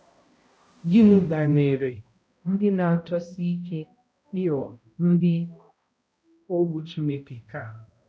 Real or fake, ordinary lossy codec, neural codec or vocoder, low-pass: fake; none; codec, 16 kHz, 0.5 kbps, X-Codec, HuBERT features, trained on balanced general audio; none